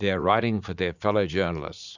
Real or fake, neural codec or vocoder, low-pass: fake; vocoder, 44.1 kHz, 80 mel bands, Vocos; 7.2 kHz